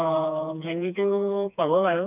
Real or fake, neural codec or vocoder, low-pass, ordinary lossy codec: fake; codec, 16 kHz, 2 kbps, FreqCodec, smaller model; 3.6 kHz; none